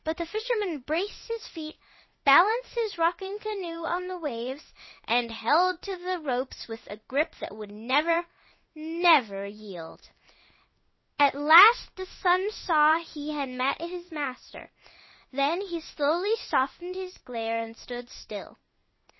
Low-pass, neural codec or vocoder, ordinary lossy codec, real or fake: 7.2 kHz; codec, 16 kHz in and 24 kHz out, 1 kbps, XY-Tokenizer; MP3, 24 kbps; fake